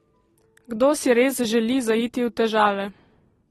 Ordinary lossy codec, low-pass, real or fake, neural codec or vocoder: AAC, 32 kbps; 19.8 kHz; real; none